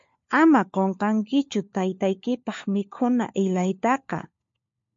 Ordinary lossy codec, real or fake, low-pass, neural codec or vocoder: MP3, 48 kbps; fake; 7.2 kHz; codec, 16 kHz, 4 kbps, FunCodec, trained on LibriTTS, 50 frames a second